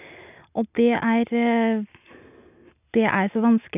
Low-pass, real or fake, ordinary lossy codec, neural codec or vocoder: 3.6 kHz; real; none; none